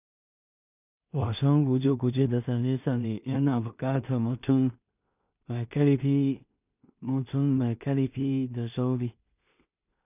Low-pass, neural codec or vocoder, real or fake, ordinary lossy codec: 3.6 kHz; codec, 16 kHz in and 24 kHz out, 0.4 kbps, LongCat-Audio-Codec, two codebook decoder; fake; none